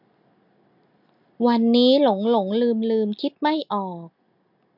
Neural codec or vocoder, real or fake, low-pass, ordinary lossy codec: none; real; 5.4 kHz; MP3, 48 kbps